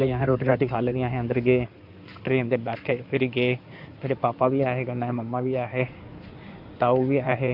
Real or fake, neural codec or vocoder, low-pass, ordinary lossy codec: fake; codec, 16 kHz in and 24 kHz out, 2.2 kbps, FireRedTTS-2 codec; 5.4 kHz; none